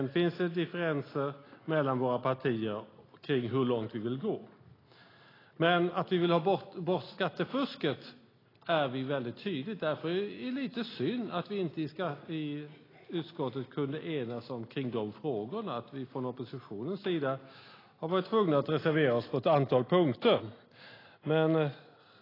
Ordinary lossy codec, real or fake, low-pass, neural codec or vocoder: AAC, 24 kbps; real; 5.4 kHz; none